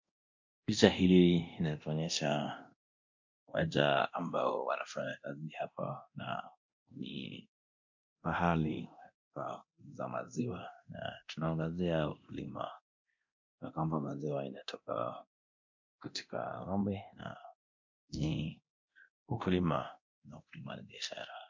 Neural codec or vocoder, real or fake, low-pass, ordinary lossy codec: codec, 24 kHz, 0.9 kbps, DualCodec; fake; 7.2 kHz; MP3, 48 kbps